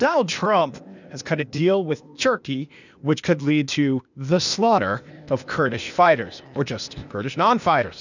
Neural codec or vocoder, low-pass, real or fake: codec, 16 kHz, 0.8 kbps, ZipCodec; 7.2 kHz; fake